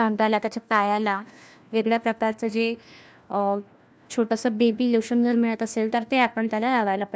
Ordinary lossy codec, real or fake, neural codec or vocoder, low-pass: none; fake; codec, 16 kHz, 1 kbps, FunCodec, trained on LibriTTS, 50 frames a second; none